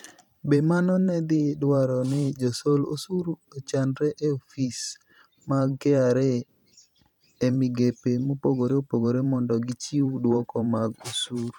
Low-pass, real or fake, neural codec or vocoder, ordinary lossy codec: 19.8 kHz; fake; vocoder, 44.1 kHz, 128 mel bands every 512 samples, BigVGAN v2; none